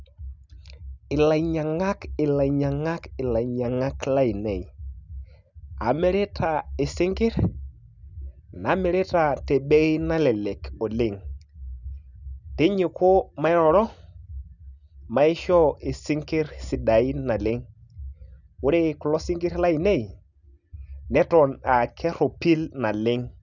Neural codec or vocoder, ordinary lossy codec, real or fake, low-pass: none; none; real; 7.2 kHz